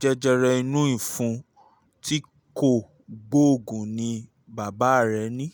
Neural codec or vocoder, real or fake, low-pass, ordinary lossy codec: none; real; none; none